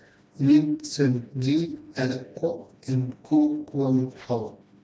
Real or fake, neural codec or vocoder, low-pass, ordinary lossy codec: fake; codec, 16 kHz, 1 kbps, FreqCodec, smaller model; none; none